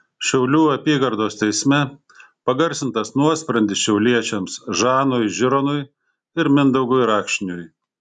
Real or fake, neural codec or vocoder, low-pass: real; none; 10.8 kHz